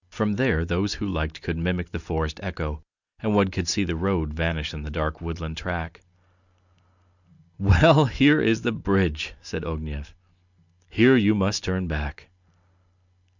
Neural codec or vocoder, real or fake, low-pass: none; real; 7.2 kHz